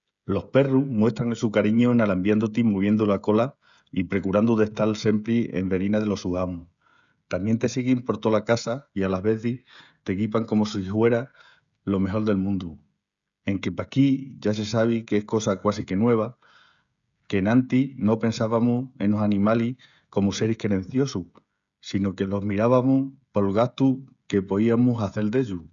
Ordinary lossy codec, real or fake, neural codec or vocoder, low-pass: none; fake; codec, 16 kHz, 16 kbps, FreqCodec, smaller model; 7.2 kHz